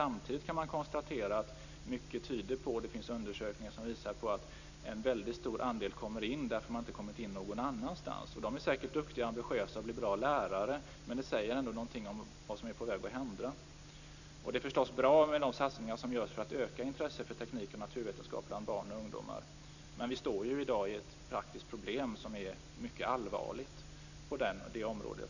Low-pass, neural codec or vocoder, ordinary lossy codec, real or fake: 7.2 kHz; none; none; real